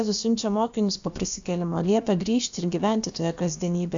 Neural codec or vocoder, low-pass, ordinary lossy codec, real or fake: codec, 16 kHz, about 1 kbps, DyCAST, with the encoder's durations; 7.2 kHz; AAC, 64 kbps; fake